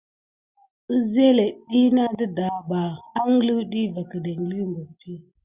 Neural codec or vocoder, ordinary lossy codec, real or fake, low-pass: none; Opus, 64 kbps; real; 3.6 kHz